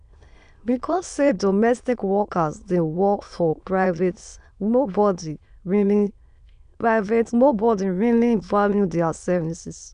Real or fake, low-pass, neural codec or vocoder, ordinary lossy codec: fake; 9.9 kHz; autoencoder, 22.05 kHz, a latent of 192 numbers a frame, VITS, trained on many speakers; none